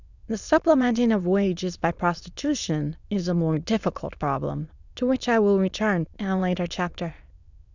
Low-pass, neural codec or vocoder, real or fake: 7.2 kHz; autoencoder, 22.05 kHz, a latent of 192 numbers a frame, VITS, trained on many speakers; fake